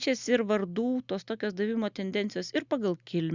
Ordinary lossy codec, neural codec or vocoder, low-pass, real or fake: Opus, 64 kbps; none; 7.2 kHz; real